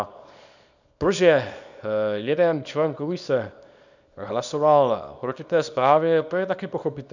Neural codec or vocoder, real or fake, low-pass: codec, 24 kHz, 0.9 kbps, WavTokenizer, small release; fake; 7.2 kHz